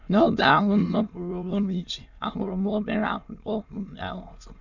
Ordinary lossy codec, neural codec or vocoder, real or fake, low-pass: none; autoencoder, 22.05 kHz, a latent of 192 numbers a frame, VITS, trained on many speakers; fake; 7.2 kHz